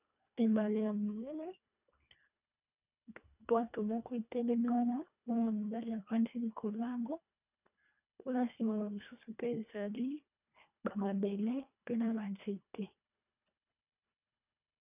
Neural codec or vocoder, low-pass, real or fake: codec, 24 kHz, 1.5 kbps, HILCodec; 3.6 kHz; fake